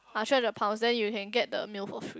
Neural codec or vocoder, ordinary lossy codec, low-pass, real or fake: none; none; none; real